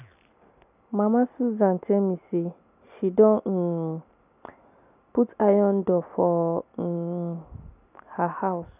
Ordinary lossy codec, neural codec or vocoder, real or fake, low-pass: none; none; real; 3.6 kHz